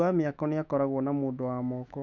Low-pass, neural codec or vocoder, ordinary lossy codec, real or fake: 7.2 kHz; autoencoder, 48 kHz, 128 numbers a frame, DAC-VAE, trained on Japanese speech; none; fake